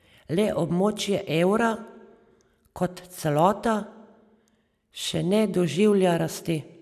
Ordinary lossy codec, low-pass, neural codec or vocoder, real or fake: none; 14.4 kHz; none; real